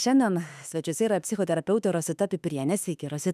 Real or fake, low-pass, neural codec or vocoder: fake; 14.4 kHz; autoencoder, 48 kHz, 32 numbers a frame, DAC-VAE, trained on Japanese speech